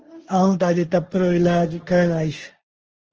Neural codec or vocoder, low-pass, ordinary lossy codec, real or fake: codec, 16 kHz, 1.1 kbps, Voila-Tokenizer; 7.2 kHz; Opus, 16 kbps; fake